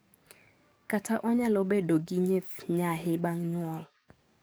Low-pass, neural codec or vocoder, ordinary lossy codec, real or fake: none; codec, 44.1 kHz, 7.8 kbps, DAC; none; fake